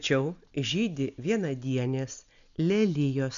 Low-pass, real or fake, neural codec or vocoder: 7.2 kHz; real; none